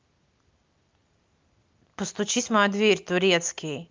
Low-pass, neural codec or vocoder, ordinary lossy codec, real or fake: 7.2 kHz; none; Opus, 24 kbps; real